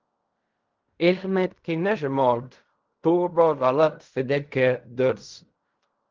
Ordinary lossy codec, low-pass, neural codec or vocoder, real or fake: Opus, 24 kbps; 7.2 kHz; codec, 16 kHz in and 24 kHz out, 0.4 kbps, LongCat-Audio-Codec, fine tuned four codebook decoder; fake